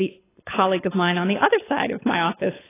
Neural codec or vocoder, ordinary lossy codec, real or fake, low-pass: codec, 24 kHz, 6 kbps, HILCodec; AAC, 16 kbps; fake; 3.6 kHz